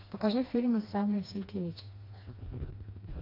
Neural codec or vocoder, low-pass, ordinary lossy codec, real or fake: codec, 16 kHz, 2 kbps, FreqCodec, smaller model; 5.4 kHz; AAC, 32 kbps; fake